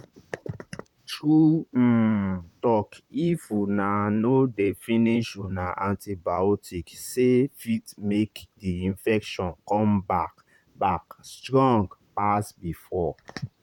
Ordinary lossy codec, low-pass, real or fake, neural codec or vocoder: none; 19.8 kHz; fake; vocoder, 44.1 kHz, 128 mel bands, Pupu-Vocoder